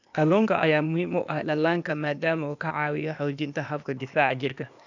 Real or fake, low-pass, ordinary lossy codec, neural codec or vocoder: fake; 7.2 kHz; none; codec, 16 kHz, 0.8 kbps, ZipCodec